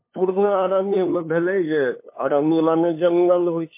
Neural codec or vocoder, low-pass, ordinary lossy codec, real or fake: codec, 16 kHz, 2 kbps, FunCodec, trained on LibriTTS, 25 frames a second; 3.6 kHz; MP3, 24 kbps; fake